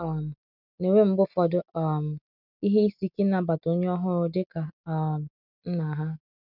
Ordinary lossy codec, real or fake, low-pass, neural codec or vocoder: none; real; 5.4 kHz; none